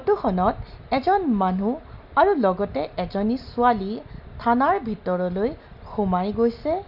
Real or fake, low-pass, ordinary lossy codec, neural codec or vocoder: real; 5.4 kHz; none; none